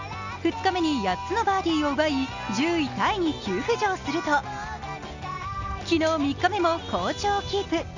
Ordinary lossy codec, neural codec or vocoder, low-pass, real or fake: Opus, 64 kbps; none; 7.2 kHz; real